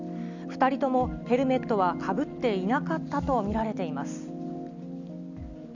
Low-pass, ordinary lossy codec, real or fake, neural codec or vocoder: 7.2 kHz; none; real; none